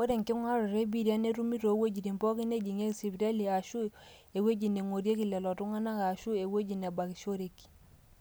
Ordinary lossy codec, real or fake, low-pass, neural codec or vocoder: none; real; none; none